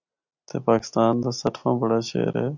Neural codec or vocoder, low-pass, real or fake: none; 7.2 kHz; real